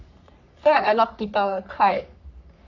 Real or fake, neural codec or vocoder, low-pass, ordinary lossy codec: fake; codec, 44.1 kHz, 3.4 kbps, Pupu-Codec; 7.2 kHz; none